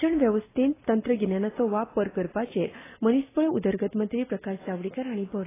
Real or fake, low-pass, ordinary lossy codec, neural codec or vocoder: real; 3.6 kHz; AAC, 16 kbps; none